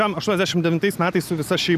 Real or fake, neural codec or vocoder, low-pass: real; none; 14.4 kHz